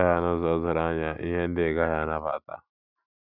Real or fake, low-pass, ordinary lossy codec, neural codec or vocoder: real; 5.4 kHz; none; none